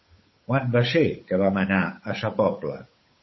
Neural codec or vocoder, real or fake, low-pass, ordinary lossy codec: codec, 16 kHz, 8 kbps, FunCodec, trained on Chinese and English, 25 frames a second; fake; 7.2 kHz; MP3, 24 kbps